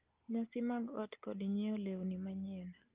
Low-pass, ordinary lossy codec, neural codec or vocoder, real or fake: 3.6 kHz; Opus, 32 kbps; none; real